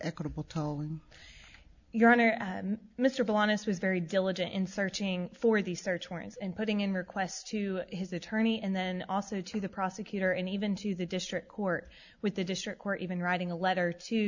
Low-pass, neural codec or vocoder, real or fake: 7.2 kHz; none; real